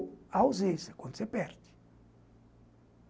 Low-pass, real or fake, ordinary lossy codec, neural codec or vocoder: none; real; none; none